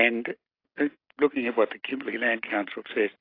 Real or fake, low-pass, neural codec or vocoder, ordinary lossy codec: real; 5.4 kHz; none; AAC, 32 kbps